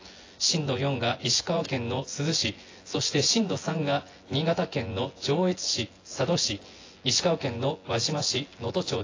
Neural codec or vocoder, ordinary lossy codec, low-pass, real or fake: vocoder, 24 kHz, 100 mel bands, Vocos; AAC, 32 kbps; 7.2 kHz; fake